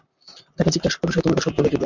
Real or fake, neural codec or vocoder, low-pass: fake; vocoder, 44.1 kHz, 128 mel bands, Pupu-Vocoder; 7.2 kHz